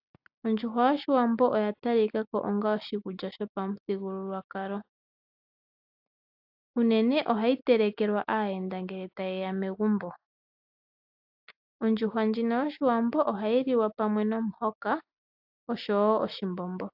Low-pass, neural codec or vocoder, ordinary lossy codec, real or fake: 5.4 kHz; none; AAC, 48 kbps; real